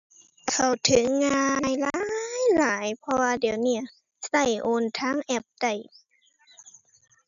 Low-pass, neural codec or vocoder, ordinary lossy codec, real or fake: 7.2 kHz; none; none; real